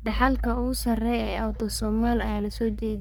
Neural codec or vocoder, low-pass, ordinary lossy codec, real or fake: codec, 44.1 kHz, 3.4 kbps, Pupu-Codec; none; none; fake